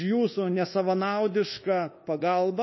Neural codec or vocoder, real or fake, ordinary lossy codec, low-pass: codec, 24 kHz, 1.2 kbps, DualCodec; fake; MP3, 24 kbps; 7.2 kHz